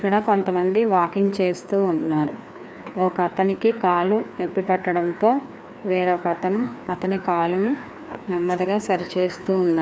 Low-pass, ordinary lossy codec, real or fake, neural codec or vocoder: none; none; fake; codec, 16 kHz, 2 kbps, FreqCodec, larger model